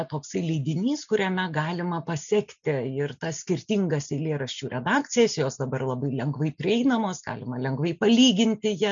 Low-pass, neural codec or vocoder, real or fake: 7.2 kHz; none; real